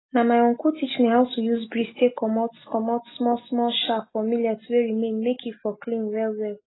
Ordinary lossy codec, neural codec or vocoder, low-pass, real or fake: AAC, 16 kbps; none; 7.2 kHz; real